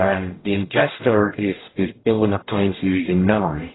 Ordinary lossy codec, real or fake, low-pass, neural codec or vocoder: AAC, 16 kbps; fake; 7.2 kHz; codec, 44.1 kHz, 0.9 kbps, DAC